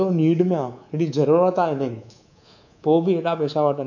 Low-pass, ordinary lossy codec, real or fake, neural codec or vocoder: 7.2 kHz; none; fake; codec, 24 kHz, 3.1 kbps, DualCodec